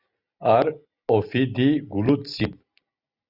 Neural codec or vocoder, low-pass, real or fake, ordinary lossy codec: none; 5.4 kHz; real; Opus, 64 kbps